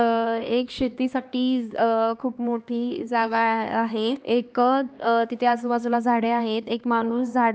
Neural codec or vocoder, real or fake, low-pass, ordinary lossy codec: codec, 16 kHz, 2 kbps, X-Codec, HuBERT features, trained on LibriSpeech; fake; none; none